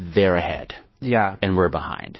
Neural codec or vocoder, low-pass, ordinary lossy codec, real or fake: codec, 16 kHz, 2 kbps, FunCodec, trained on Chinese and English, 25 frames a second; 7.2 kHz; MP3, 24 kbps; fake